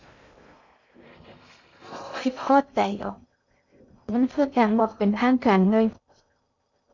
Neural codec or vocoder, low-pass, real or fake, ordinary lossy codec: codec, 16 kHz in and 24 kHz out, 0.6 kbps, FocalCodec, streaming, 2048 codes; 7.2 kHz; fake; MP3, 64 kbps